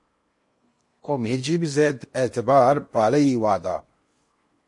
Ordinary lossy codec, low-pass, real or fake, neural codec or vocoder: MP3, 48 kbps; 10.8 kHz; fake; codec, 16 kHz in and 24 kHz out, 0.8 kbps, FocalCodec, streaming, 65536 codes